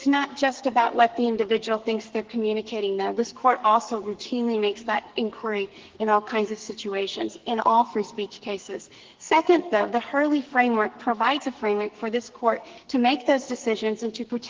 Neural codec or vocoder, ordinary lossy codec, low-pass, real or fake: codec, 44.1 kHz, 2.6 kbps, SNAC; Opus, 16 kbps; 7.2 kHz; fake